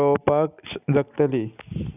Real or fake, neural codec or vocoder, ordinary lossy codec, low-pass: real; none; none; 3.6 kHz